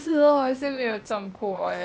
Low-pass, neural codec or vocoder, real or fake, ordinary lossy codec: none; codec, 16 kHz, 0.8 kbps, ZipCodec; fake; none